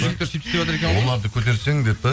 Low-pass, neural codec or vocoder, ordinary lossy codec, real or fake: none; none; none; real